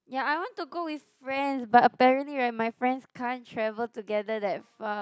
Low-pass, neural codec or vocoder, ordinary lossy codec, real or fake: none; none; none; real